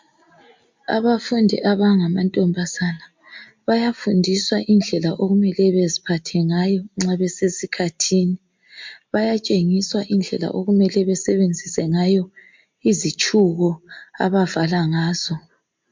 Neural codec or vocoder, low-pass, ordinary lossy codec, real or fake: none; 7.2 kHz; MP3, 64 kbps; real